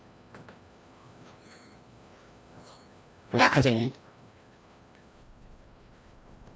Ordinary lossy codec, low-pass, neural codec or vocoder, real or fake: none; none; codec, 16 kHz, 1 kbps, FreqCodec, larger model; fake